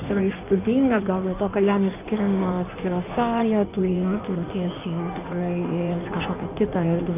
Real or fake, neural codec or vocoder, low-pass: fake; codec, 16 kHz in and 24 kHz out, 1.1 kbps, FireRedTTS-2 codec; 3.6 kHz